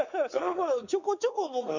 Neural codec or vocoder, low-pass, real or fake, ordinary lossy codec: codec, 16 kHz, 4 kbps, X-Codec, WavLM features, trained on Multilingual LibriSpeech; 7.2 kHz; fake; none